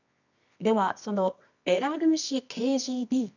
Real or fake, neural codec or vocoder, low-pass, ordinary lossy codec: fake; codec, 24 kHz, 0.9 kbps, WavTokenizer, medium music audio release; 7.2 kHz; none